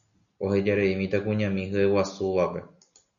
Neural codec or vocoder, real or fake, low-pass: none; real; 7.2 kHz